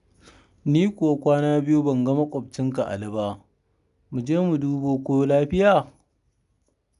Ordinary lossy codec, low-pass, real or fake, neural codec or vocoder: none; 10.8 kHz; real; none